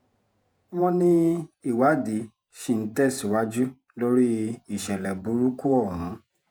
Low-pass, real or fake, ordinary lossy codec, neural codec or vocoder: none; fake; none; vocoder, 48 kHz, 128 mel bands, Vocos